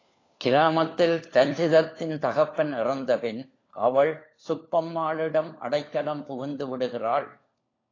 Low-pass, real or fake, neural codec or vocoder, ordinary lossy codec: 7.2 kHz; fake; codec, 16 kHz, 4 kbps, FunCodec, trained on LibriTTS, 50 frames a second; AAC, 32 kbps